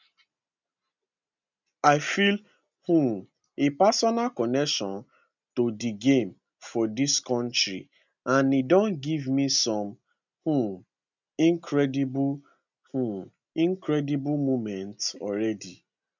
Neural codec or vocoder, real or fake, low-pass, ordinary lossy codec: none; real; 7.2 kHz; none